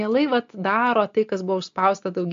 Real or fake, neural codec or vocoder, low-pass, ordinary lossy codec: real; none; 7.2 kHz; MP3, 48 kbps